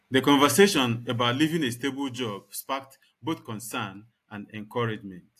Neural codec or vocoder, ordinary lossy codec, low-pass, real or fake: none; AAC, 64 kbps; 14.4 kHz; real